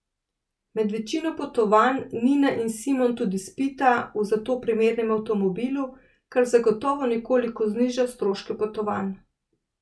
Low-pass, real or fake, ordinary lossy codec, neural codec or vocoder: none; real; none; none